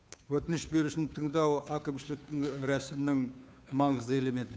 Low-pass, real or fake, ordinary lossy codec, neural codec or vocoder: none; fake; none; codec, 16 kHz, 2 kbps, FunCodec, trained on Chinese and English, 25 frames a second